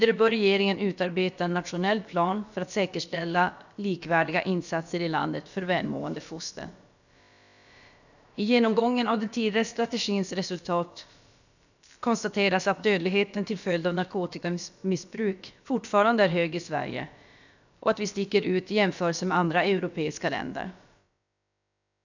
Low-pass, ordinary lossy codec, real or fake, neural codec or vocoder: 7.2 kHz; none; fake; codec, 16 kHz, about 1 kbps, DyCAST, with the encoder's durations